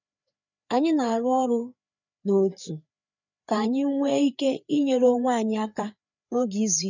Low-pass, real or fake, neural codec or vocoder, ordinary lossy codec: 7.2 kHz; fake; codec, 16 kHz, 4 kbps, FreqCodec, larger model; none